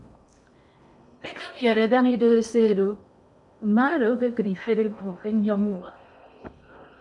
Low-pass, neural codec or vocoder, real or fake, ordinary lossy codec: 10.8 kHz; codec, 16 kHz in and 24 kHz out, 0.8 kbps, FocalCodec, streaming, 65536 codes; fake; MP3, 96 kbps